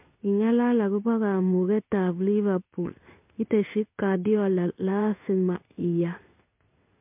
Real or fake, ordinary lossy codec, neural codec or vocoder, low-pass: fake; MP3, 32 kbps; codec, 16 kHz in and 24 kHz out, 1 kbps, XY-Tokenizer; 3.6 kHz